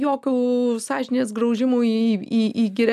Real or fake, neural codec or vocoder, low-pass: real; none; 14.4 kHz